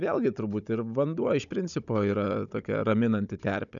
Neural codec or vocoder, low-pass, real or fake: codec, 16 kHz, 16 kbps, FunCodec, trained on Chinese and English, 50 frames a second; 7.2 kHz; fake